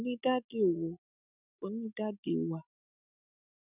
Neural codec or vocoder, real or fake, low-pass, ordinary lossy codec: none; real; 3.6 kHz; none